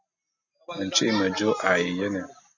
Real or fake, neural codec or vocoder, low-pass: real; none; 7.2 kHz